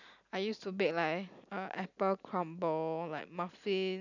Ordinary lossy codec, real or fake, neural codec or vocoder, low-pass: none; real; none; 7.2 kHz